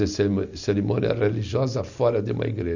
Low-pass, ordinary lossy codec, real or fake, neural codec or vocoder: 7.2 kHz; none; real; none